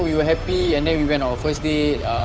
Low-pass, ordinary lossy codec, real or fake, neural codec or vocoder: none; none; fake; codec, 16 kHz, 8 kbps, FunCodec, trained on Chinese and English, 25 frames a second